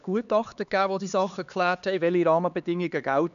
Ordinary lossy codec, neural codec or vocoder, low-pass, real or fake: none; codec, 16 kHz, 2 kbps, X-Codec, HuBERT features, trained on LibriSpeech; 7.2 kHz; fake